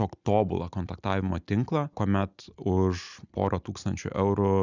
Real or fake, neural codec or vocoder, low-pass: real; none; 7.2 kHz